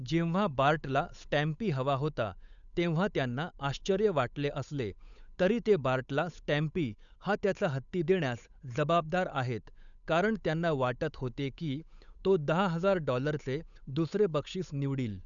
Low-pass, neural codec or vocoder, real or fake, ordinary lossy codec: 7.2 kHz; codec, 16 kHz, 8 kbps, FunCodec, trained on Chinese and English, 25 frames a second; fake; none